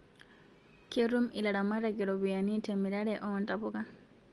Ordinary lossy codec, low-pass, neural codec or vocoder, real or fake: Opus, 24 kbps; 10.8 kHz; none; real